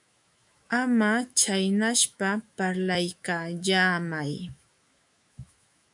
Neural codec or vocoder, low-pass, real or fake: autoencoder, 48 kHz, 128 numbers a frame, DAC-VAE, trained on Japanese speech; 10.8 kHz; fake